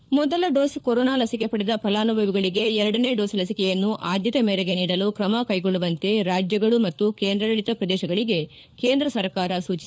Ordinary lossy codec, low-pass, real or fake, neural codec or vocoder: none; none; fake; codec, 16 kHz, 4 kbps, FunCodec, trained on LibriTTS, 50 frames a second